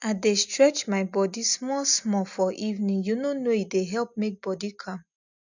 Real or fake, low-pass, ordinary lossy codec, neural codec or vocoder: real; 7.2 kHz; none; none